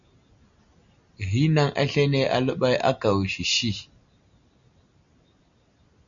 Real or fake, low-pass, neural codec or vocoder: real; 7.2 kHz; none